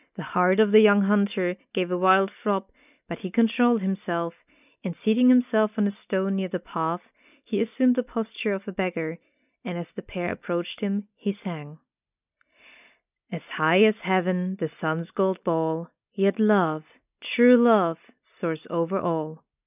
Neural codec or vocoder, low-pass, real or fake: none; 3.6 kHz; real